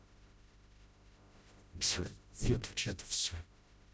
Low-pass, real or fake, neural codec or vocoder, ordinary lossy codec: none; fake; codec, 16 kHz, 0.5 kbps, FreqCodec, smaller model; none